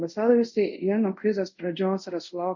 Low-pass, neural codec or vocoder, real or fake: 7.2 kHz; codec, 24 kHz, 0.5 kbps, DualCodec; fake